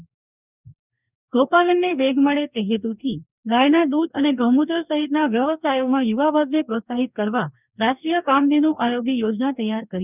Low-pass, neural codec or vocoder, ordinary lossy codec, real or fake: 3.6 kHz; codec, 44.1 kHz, 2.6 kbps, DAC; none; fake